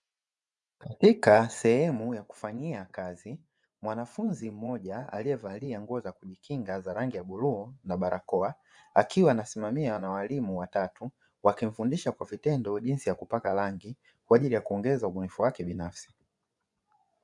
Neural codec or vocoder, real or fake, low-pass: vocoder, 24 kHz, 100 mel bands, Vocos; fake; 10.8 kHz